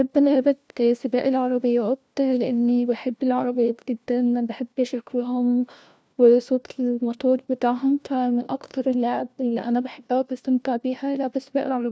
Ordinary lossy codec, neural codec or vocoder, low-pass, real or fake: none; codec, 16 kHz, 1 kbps, FunCodec, trained on LibriTTS, 50 frames a second; none; fake